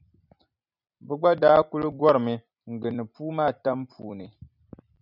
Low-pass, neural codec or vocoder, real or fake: 5.4 kHz; vocoder, 44.1 kHz, 128 mel bands every 256 samples, BigVGAN v2; fake